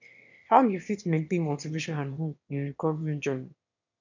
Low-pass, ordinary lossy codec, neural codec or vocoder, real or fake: 7.2 kHz; none; autoencoder, 22.05 kHz, a latent of 192 numbers a frame, VITS, trained on one speaker; fake